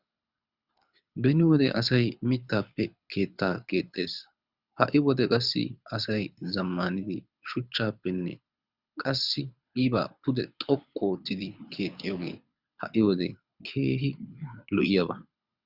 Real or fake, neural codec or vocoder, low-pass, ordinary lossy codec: fake; codec, 24 kHz, 6 kbps, HILCodec; 5.4 kHz; Opus, 64 kbps